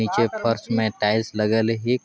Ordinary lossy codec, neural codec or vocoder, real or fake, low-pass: none; none; real; none